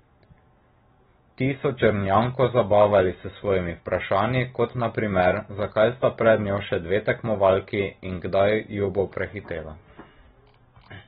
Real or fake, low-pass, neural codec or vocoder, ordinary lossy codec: real; 10.8 kHz; none; AAC, 16 kbps